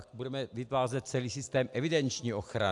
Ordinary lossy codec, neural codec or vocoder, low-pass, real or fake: AAC, 64 kbps; none; 10.8 kHz; real